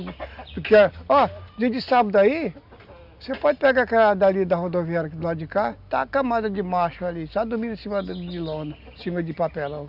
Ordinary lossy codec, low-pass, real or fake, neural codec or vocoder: none; 5.4 kHz; real; none